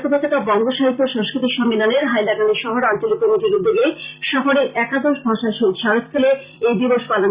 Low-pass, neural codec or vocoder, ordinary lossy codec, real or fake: 3.6 kHz; vocoder, 44.1 kHz, 128 mel bands every 256 samples, BigVGAN v2; Opus, 64 kbps; fake